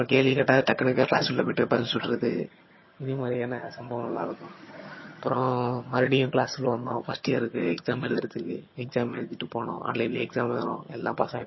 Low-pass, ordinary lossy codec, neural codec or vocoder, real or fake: 7.2 kHz; MP3, 24 kbps; vocoder, 22.05 kHz, 80 mel bands, HiFi-GAN; fake